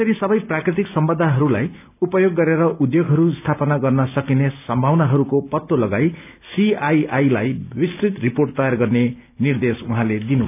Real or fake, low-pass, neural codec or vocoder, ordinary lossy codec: real; 3.6 kHz; none; none